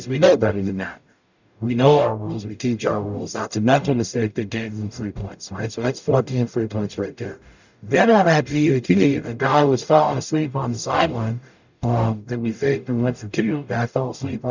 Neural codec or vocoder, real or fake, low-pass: codec, 44.1 kHz, 0.9 kbps, DAC; fake; 7.2 kHz